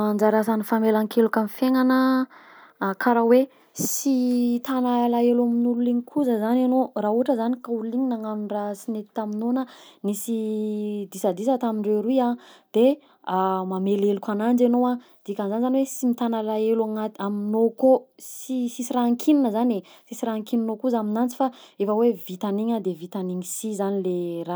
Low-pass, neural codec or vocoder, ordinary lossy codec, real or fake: none; none; none; real